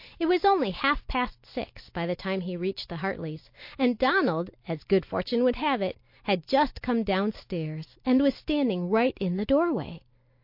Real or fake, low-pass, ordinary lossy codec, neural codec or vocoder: real; 5.4 kHz; MP3, 32 kbps; none